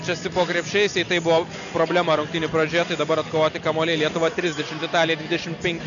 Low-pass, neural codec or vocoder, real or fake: 7.2 kHz; none; real